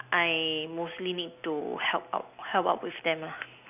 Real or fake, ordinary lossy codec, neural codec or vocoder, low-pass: real; none; none; 3.6 kHz